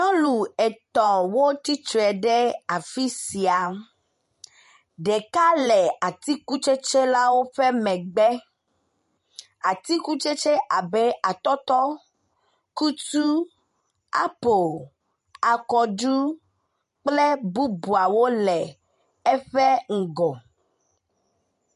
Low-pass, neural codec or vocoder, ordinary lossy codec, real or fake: 14.4 kHz; vocoder, 44.1 kHz, 128 mel bands every 512 samples, BigVGAN v2; MP3, 48 kbps; fake